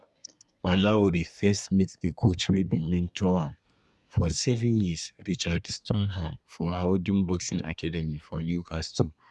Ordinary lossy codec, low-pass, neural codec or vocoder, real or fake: none; none; codec, 24 kHz, 1 kbps, SNAC; fake